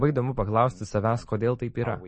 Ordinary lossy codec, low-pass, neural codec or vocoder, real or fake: MP3, 32 kbps; 10.8 kHz; none; real